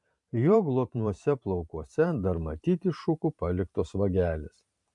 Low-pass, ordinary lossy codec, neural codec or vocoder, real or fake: 10.8 kHz; MP3, 64 kbps; vocoder, 44.1 kHz, 128 mel bands every 512 samples, BigVGAN v2; fake